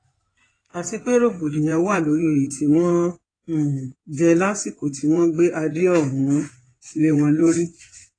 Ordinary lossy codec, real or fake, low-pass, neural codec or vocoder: AAC, 32 kbps; fake; 9.9 kHz; codec, 16 kHz in and 24 kHz out, 2.2 kbps, FireRedTTS-2 codec